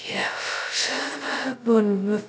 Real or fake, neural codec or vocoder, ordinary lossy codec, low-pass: fake; codec, 16 kHz, 0.2 kbps, FocalCodec; none; none